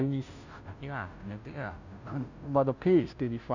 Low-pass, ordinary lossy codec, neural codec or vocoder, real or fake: 7.2 kHz; none; codec, 16 kHz, 0.5 kbps, FunCodec, trained on Chinese and English, 25 frames a second; fake